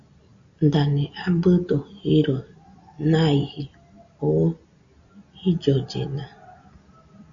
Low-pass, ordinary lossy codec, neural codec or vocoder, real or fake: 7.2 kHz; Opus, 64 kbps; none; real